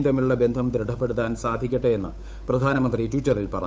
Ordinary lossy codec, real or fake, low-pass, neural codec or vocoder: none; fake; none; codec, 16 kHz, 8 kbps, FunCodec, trained on Chinese and English, 25 frames a second